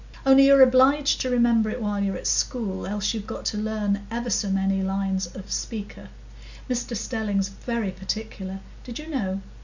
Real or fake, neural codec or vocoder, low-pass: real; none; 7.2 kHz